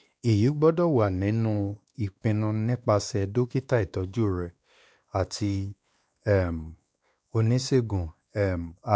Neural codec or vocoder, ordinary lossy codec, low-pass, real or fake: codec, 16 kHz, 2 kbps, X-Codec, WavLM features, trained on Multilingual LibriSpeech; none; none; fake